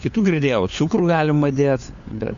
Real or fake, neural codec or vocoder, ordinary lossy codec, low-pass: fake; codec, 16 kHz, 4 kbps, FunCodec, trained on LibriTTS, 50 frames a second; MP3, 96 kbps; 7.2 kHz